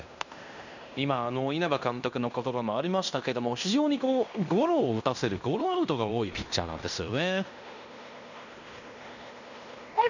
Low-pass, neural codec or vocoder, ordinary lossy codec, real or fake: 7.2 kHz; codec, 16 kHz in and 24 kHz out, 0.9 kbps, LongCat-Audio-Codec, fine tuned four codebook decoder; none; fake